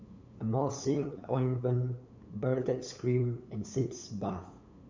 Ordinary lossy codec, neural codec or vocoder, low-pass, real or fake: MP3, 64 kbps; codec, 16 kHz, 8 kbps, FunCodec, trained on LibriTTS, 25 frames a second; 7.2 kHz; fake